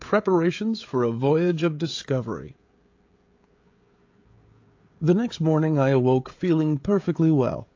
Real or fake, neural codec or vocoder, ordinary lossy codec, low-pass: fake; codec, 16 kHz, 16 kbps, FreqCodec, smaller model; AAC, 48 kbps; 7.2 kHz